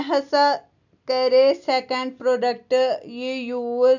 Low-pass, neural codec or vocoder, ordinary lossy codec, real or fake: 7.2 kHz; none; none; real